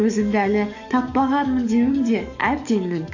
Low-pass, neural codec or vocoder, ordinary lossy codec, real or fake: 7.2 kHz; codec, 44.1 kHz, 7.8 kbps, DAC; AAC, 48 kbps; fake